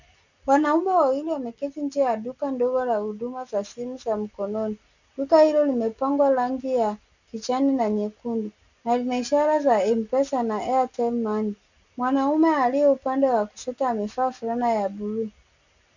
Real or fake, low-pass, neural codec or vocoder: real; 7.2 kHz; none